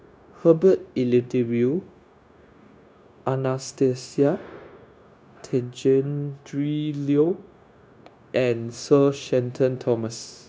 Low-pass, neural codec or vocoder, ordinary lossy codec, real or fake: none; codec, 16 kHz, 0.9 kbps, LongCat-Audio-Codec; none; fake